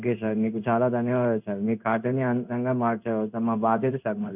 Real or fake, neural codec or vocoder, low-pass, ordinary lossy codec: fake; codec, 16 kHz in and 24 kHz out, 1 kbps, XY-Tokenizer; 3.6 kHz; none